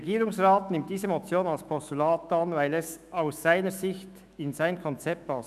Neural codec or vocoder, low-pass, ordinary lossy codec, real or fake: autoencoder, 48 kHz, 128 numbers a frame, DAC-VAE, trained on Japanese speech; 14.4 kHz; none; fake